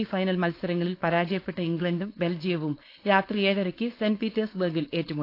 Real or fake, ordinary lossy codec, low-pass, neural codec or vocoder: fake; AAC, 32 kbps; 5.4 kHz; codec, 16 kHz, 4.8 kbps, FACodec